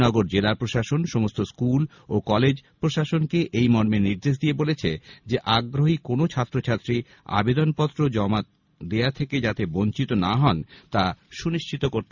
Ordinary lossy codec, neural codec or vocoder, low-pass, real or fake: none; none; 7.2 kHz; real